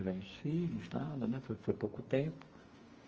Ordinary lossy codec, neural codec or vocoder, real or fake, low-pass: Opus, 24 kbps; codec, 44.1 kHz, 2.6 kbps, SNAC; fake; 7.2 kHz